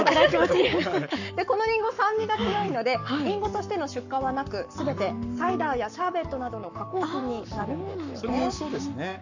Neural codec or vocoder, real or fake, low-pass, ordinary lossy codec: codec, 44.1 kHz, 7.8 kbps, Pupu-Codec; fake; 7.2 kHz; none